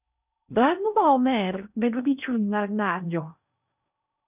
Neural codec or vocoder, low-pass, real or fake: codec, 16 kHz in and 24 kHz out, 0.6 kbps, FocalCodec, streaming, 4096 codes; 3.6 kHz; fake